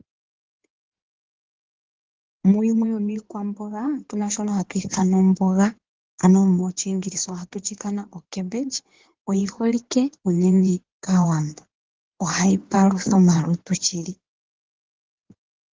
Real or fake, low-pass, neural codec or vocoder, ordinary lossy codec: fake; 7.2 kHz; codec, 16 kHz in and 24 kHz out, 2.2 kbps, FireRedTTS-2 codec; Opus, 16 kbps